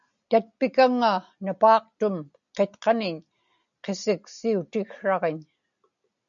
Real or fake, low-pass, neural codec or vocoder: real; 7.2 kHz; none